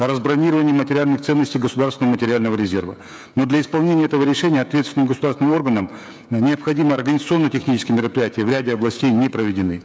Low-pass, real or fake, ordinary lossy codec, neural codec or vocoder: none; fake; none; codec, 16 kHz, 16 kbps, FreqCodec, smaller model